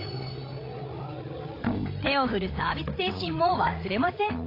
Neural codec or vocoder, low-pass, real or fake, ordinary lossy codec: codec, 16 kHz, 8 kbps, FreqCodec, larger model; 5.4 kHz; fake; AAC, 32 kbps